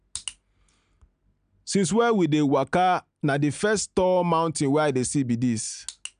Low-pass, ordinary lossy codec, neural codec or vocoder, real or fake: 9.9 kHz; none; none; real